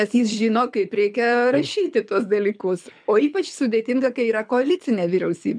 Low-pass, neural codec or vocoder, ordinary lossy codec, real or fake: 9.9 kHz; codec, 24 kHz, 6 kbps, HILCodec; MP3, 96 kbps; fake